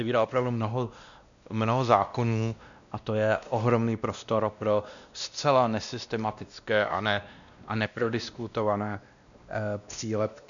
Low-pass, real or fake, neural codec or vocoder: 7.2 kHz; fake; codec, 16 kHz, 1 kbps, X-Codec, WavLM features, trained on Multilingual LibriSpeech